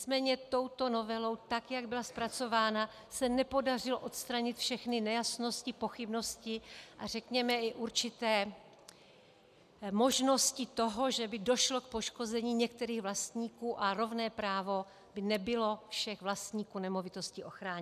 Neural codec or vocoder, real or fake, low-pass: none; real; 14.4 kHz